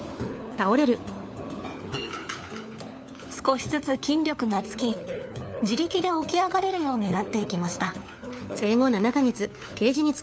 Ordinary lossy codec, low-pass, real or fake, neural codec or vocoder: none; none; fake; codec, 16 kHz, 4 kbps, FunCodec, trained on LibriTTS, 50 frames a second